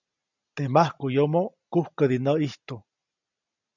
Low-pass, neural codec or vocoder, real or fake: 7.2 kHz; none; real